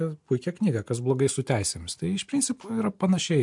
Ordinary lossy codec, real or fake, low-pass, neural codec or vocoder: MP3, 64 kbps; real; 10.8 kHz; none